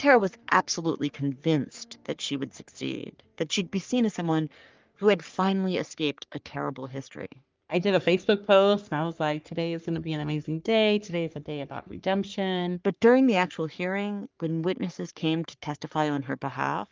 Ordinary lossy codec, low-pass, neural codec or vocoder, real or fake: Opus, 24 kbps; 7.2 kHz; codec, 44.1 kHz, 3.4 kbps, Pupu-Codec; fake